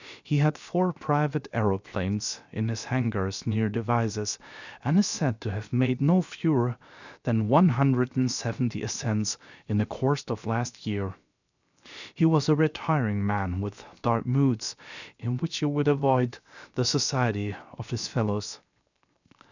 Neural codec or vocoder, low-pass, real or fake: codec, 16 kHz, 0.7 kbps, FocalCodec; 7.2 kHz; fake